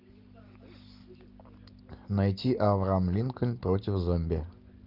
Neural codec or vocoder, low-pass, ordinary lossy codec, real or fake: none; 5.4 kHz; Opus, 32 kbps; real